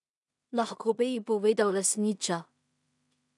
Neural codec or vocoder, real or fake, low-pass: codec, 16 kHz in and 24 kHz out, 0.4 kbps, LongCat-Audio-Codec, two codebook decoder; fake; 10.8 kHz